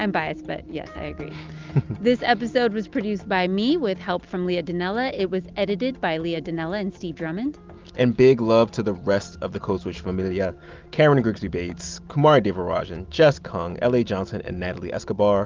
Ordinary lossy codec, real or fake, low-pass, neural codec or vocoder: Opus, 32 kbps; real; 7.2 kHz; none